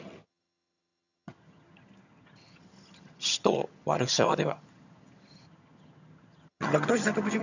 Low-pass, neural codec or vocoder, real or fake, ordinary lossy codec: 7.2 kHz; vocoder, 22.05 kHz, 80 mel bands, HiFi-GAN; fake; none